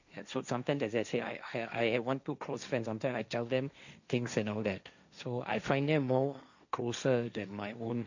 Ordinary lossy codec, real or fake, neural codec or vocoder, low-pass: none; fake; codec, 16 kHz, 1.1 kbps, Voila-Tokenizer; 7.2 kHz